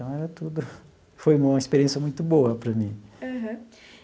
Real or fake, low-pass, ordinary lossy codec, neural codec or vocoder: real; none; none; none